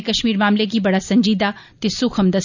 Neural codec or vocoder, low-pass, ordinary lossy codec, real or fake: none; 7.2 kHz; none; real